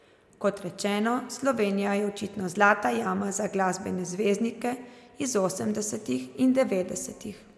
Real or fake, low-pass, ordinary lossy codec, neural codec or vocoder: real; none; none; none